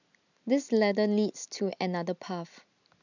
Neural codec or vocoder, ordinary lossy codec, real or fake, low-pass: none; none; real; 7.2 kHz